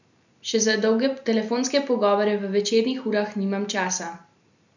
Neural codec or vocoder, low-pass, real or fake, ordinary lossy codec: none; 7.2 kHz; real; none